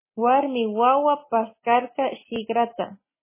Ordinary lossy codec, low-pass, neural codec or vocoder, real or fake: MP3, 16 kbps; 3.6 kHz; none; real